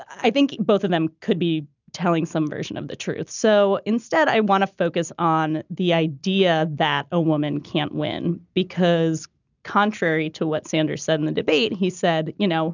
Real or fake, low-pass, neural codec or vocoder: real; 7.2 kHz; none